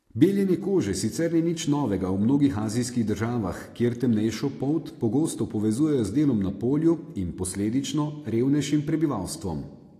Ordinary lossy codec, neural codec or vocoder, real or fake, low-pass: AAC, 48 kbps; none; real; 14.4 kHz